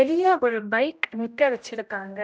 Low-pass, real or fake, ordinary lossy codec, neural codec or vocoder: none; fake; none; codec, 16 kHz, 0.5 kbps, X-Codec, HuBERT features, trained on general audio